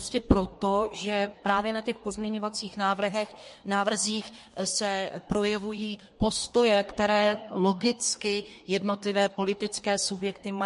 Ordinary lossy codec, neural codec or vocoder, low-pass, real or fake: MP3, 48 kbps; codec, 24 kHz, 1 kbps, SNAC; 10.8 kHz; fake